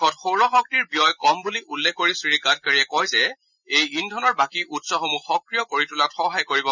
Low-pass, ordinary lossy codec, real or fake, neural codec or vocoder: 7.2 kHz; none; real; none